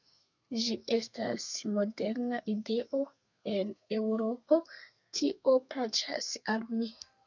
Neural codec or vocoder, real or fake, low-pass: codec, 32 kHz, 1.9 kbps, SNAC; fake; 7.2 kHz